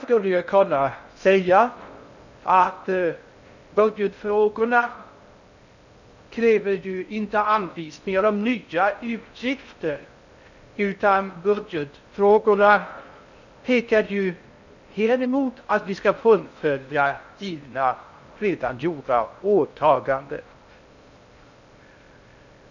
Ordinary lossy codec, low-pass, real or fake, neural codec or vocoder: none; 7.2 kHz; fake; codec, 16 kHz in and 24 kHz out, 0.6 kbps, FocalCodec, streaming, 4096 codes